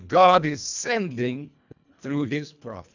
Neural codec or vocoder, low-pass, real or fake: codec, 24 kHz, 1.5 kbps, HILCodec; 7.2 kHz; fake